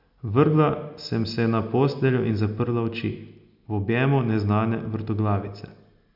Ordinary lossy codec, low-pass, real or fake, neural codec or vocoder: none; 5.4 kHz; real; none